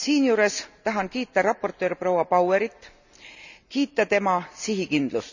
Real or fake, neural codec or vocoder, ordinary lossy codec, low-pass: real; none; none; 7.2 kHz